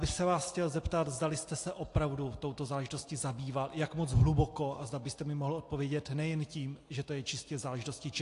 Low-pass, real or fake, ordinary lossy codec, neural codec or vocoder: 10.8 kHz; real; AAC, 48 kbps; none